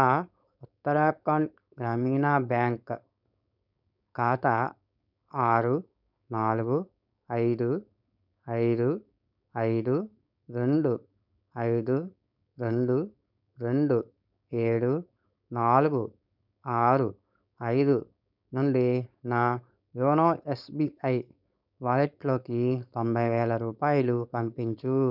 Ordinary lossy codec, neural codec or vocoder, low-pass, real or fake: none; codec, 16 kHz, 4.8 kbps, FACodec; 5.4 kHz; fake